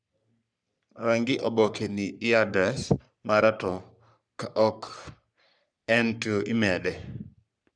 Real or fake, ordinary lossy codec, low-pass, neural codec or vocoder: fake; none; 9.9 kHz; codec, 44.1 kHz, 3.4 kbps, Pupu-Codec